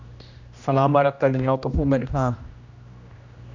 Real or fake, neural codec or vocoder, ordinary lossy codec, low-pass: fake; codec, 16 kHz, 1 kbps, X-Codec, HuBERT features, trained on general audio; MP3, 64 kbps; 7.2 kHz